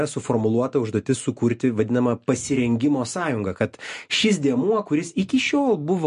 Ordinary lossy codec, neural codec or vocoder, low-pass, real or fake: MP3, 48 kbps; none; 10.8 kHz; real